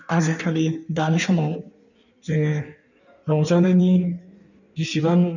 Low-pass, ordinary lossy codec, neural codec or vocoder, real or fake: 7.2 kHz; none; codec, 16 kHz in and 24 kHz out, 1.1 kbps, FireRedTTS-2 codec; fake